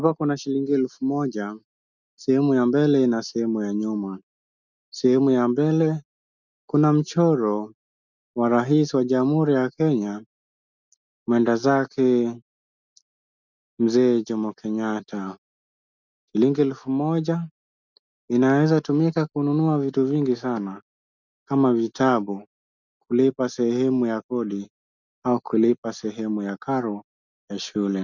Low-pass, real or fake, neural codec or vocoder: 7.2 kHz; real; none